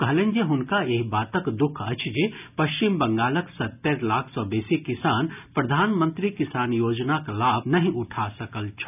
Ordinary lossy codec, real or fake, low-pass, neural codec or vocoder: none; real; 3.6 kHz; none